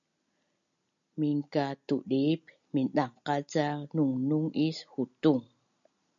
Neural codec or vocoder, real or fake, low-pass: none; real; 7.2 kHz